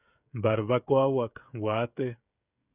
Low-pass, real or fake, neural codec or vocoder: 3.6 kHz; fake; codec, 16 kHz, 16 kbps, FreqCodec, smaller model